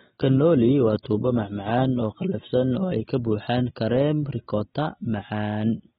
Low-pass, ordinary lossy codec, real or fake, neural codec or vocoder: 19.8 kHz; AAC, 16 kbps; real; none